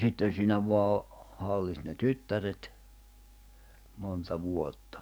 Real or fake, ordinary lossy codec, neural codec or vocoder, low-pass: fake; none; codec, 44.1 kHz, 7.8 kbps, DAC; none